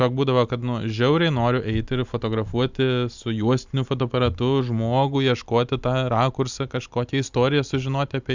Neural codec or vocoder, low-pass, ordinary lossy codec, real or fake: none; 7.2 kHz; Opus, 64 kbps; real